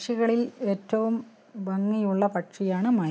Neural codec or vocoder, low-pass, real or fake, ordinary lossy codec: none; none; real; none